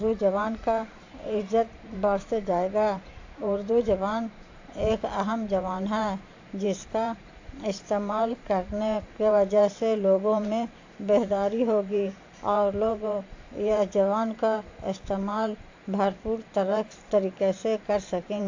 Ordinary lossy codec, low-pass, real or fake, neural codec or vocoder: AAC, 48 kbps; 7.2 kHz; fake; vocoder, 44.1 kHz, 128 mel bands every 512 samples, BigVGAN v2